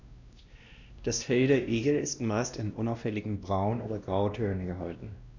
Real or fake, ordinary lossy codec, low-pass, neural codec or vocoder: fake; none; 7.2 kHz; codec, 16 kHz, 1 kbps, X-Codec, WavLM features, trained on Multilingual LibriSpeech